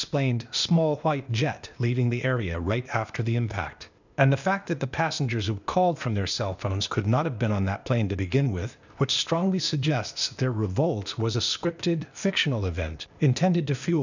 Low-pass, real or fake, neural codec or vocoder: 7.2 kHz; fake; codec, 16 kHz, 0.8 kbps, ZipCodec